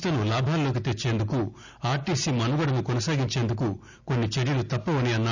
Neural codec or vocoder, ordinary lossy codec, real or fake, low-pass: none; none; real; 7.2 kHz